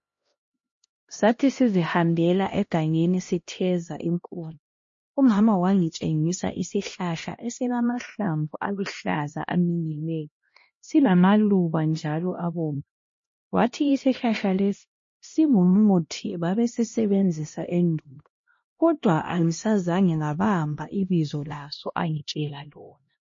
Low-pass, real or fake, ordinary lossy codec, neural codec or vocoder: 7.2 kHz; fake; MP3, 32 kbps; codec, 16 kHz, 1 kbps, X-Codec, HuBERT features, trained on LibriSpeech